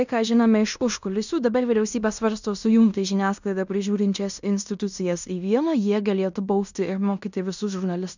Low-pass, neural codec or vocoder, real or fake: 7.2 kHz; codec, 16 kHz in and 24 kHz out, 0.9 kbps, LongCat-Audio-Codec, fine tuned four codebook decoder; fake